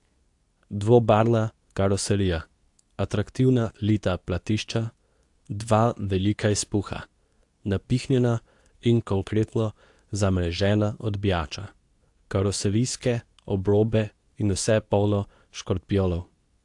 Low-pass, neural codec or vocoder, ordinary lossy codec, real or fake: 10.8 kHz; codec, 24 kHz, 0.9 kbps, WavTokenizer, medium speech release version 2; AAC, 64 kbps; fake